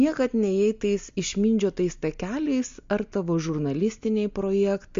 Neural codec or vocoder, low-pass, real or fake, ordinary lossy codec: none; 7.2 kHz; real; MP3, 48 kbps